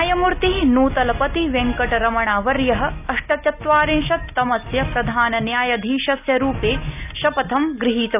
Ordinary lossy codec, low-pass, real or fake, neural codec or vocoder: none; 3.6 kHz; real; none